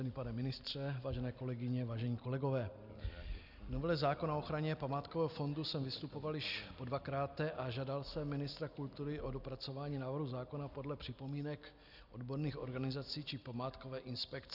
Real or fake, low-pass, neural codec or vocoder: real; 5.4 kHz; none